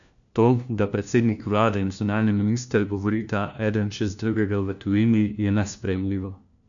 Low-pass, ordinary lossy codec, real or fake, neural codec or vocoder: 7.2 kHz; none; fake; codec, 16 kHz, 1 kbps, FunCodec, trained on LibriTTS, 50 frames a second